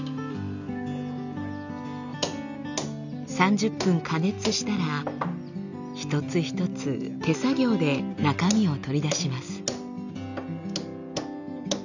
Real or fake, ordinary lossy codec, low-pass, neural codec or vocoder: real; none; 7.2 kHz; none